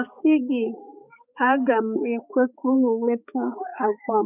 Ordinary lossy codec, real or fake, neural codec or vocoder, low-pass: none; fake; codec, 16 kHz, 4 kbps, X-Codec, HuBERT features, trained on balanced general audio; 3.6 kHz